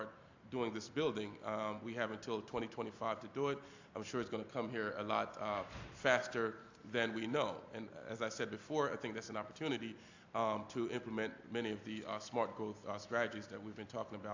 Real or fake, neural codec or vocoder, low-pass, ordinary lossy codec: real; none; 7.2 kHz; AAC, 48 kbps